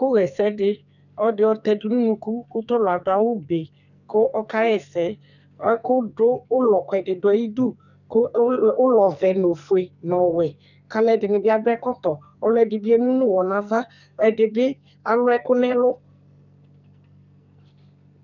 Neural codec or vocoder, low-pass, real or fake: codec, 44.1 kHz, 2.6 kbps, SNAC; 7.2 kHz; fake